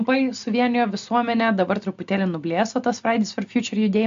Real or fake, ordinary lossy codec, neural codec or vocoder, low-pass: real; MP3, 64 kbps; none; 7.2 kHz